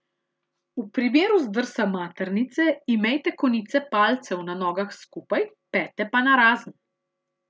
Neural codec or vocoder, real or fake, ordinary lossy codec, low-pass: none; real; none; none